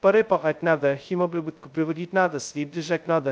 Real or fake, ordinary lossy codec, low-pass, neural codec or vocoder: fake; none; none; codec, 16 kHz, 0.2 kbps, FocalCodec